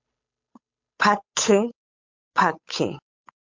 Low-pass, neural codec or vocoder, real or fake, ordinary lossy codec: 7.2 kHz; codec, 16 kHz, 8 kbps, FunCodec, trained on Chinese and English, 25 frames a second; fake; MP3, 48 kbps